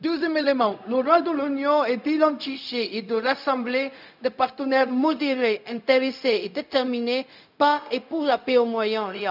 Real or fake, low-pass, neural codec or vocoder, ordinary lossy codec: fake; 5.4 kHz; codec, 16 kHz, 0.4 kbps, LongCat-Audio-Codec; none